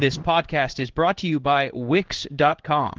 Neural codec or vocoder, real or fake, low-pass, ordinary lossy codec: codec, 16 kHz in and 24 kHz out, 1 kbps, XY-Tokenizer; fake; 7.2 kHz; Opus, 16 kbps